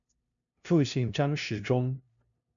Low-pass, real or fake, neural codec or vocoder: 7.2 kHz; fake; codec, 16 kHz, 0.5 kbps, FunCodec, trained on LibriTTS, 25 frames a second